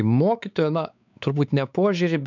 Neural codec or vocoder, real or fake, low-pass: codec, 16 kHz, 4 kbps, X-Codec, WavLM features, trained on Multilingual LibriSpeech; fake; 7.2 kHz